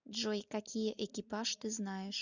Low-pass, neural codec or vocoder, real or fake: 7.2 kHz; none; real